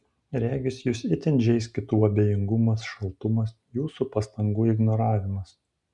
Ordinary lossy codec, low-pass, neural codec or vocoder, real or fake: MP3, 96 kbps; 10.8 kHz; none; real